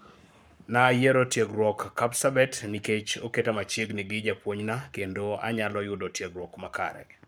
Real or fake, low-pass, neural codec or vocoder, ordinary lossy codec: fake; none; codec, 44.1 kHz, 7.8 kbps, Pupu-Codec; none